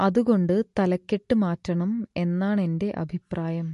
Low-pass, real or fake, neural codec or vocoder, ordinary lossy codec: 14.4 kHz; real; none; MP3, 48 kbps